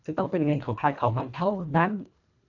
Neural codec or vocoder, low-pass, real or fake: codec, 24 kHz, 1.5 kbps, HILCodec; 7.2 kHz; fake